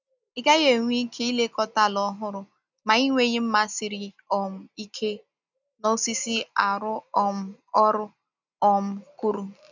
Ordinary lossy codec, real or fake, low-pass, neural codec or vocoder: none; real; 7.2 kHz; none